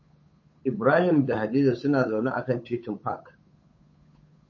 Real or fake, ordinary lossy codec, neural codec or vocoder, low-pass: fake; MP3, 32 kbps; codec, 16 kHz, 8 kbps, FunCodec, trained on Chinese and English, 25 frames a second; 7.2 kHz